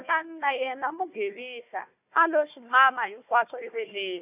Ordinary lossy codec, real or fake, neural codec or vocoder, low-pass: none; fake; codec, 16 kHz, 1 kbps, FunCodec, trained on Chinese and English, 50 frames a second; 3.6 kHz